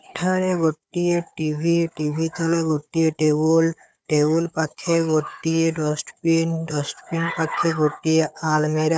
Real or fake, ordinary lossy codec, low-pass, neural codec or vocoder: fake; none; none; codec, 16 kHz, 4 kbps, FunCodec, trained on Chinese and English, 50 frames a second